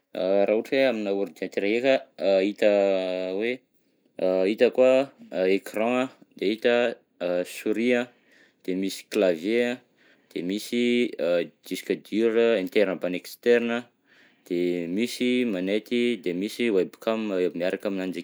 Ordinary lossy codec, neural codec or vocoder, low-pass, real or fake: none; none; none; real